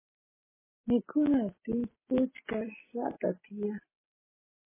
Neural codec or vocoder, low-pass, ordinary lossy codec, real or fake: none; 3.6 kHz; MP3, 16 kbps; real